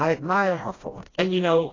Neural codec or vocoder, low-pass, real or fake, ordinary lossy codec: codec, 16 kHz, 1 kbps, FreqCodec, smaller model; 7.2 kHz; fake; AAC, 32 kbps